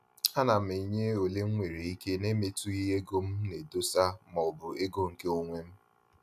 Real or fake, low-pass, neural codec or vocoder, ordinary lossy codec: real; 14.4 kHz; none; none